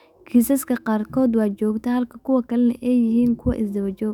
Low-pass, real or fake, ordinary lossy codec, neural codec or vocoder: 19.8 kHz; fake; none; autoencoder, 48 kHz, 128 numbers a frame, DAC-VAE, trained on Japanese speech